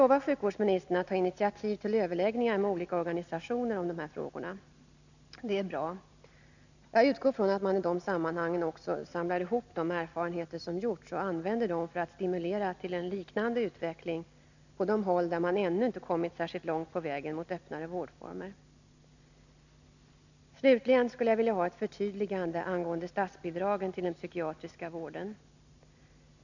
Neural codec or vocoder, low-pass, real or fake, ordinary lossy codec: none; 7.2 kHz; real; none